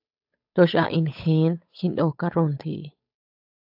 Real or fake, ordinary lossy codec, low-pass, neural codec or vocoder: fake; AAC, 48 kbps; 5.4 kHz; codec, 16 kHz, 8 kbps, FunCodec, trained on Chinese and English, 25 frames a second